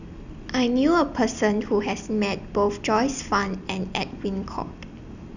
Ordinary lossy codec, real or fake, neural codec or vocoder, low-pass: none; real; none; 7.2 kHz